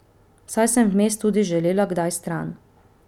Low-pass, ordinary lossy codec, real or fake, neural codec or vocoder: 19.8 kHz; none; real; none